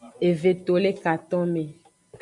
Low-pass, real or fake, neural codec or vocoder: 10.8 kHz; real; none